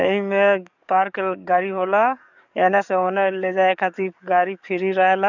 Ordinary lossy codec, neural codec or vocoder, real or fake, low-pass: none; codec, 44.1 kHz, 7.8 kbps, DAC; fake; 7.2 kHz